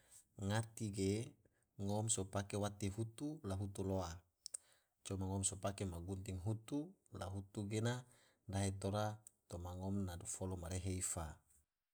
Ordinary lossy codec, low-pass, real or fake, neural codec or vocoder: none; none; real; none